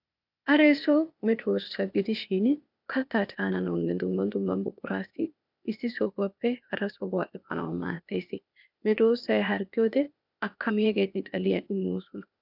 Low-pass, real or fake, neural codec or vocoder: 5.4 kHz; fake; codec, 16 kHz, 0.8 kbps, ZipCodec